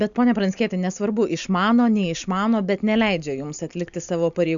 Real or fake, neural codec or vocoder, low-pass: real; none; 7.2 kHz